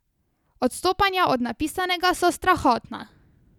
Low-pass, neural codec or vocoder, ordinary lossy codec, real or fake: 19.8 kHz; none; none; real